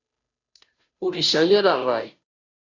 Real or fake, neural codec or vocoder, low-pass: fake; codec, 16 kHz, 0.5 kbps, FunCodec, trained on Chinese and English, 25 frames a second; 7.2 kHz